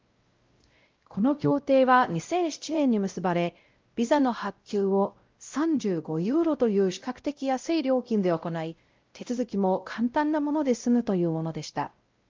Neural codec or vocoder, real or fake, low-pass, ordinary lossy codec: codec, 16 kHz, 0.5 kbps, X-Codec, WavLM features, trained on Multilingual LibriSpeech; fake; 7.2 kHz; Opus, 32 kbps